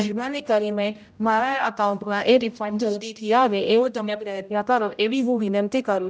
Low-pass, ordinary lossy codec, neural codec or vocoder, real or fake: none; none; codec, 16 kHz, 0.5 kbps, X-Codec, HuBERT features, trained on general audio; fake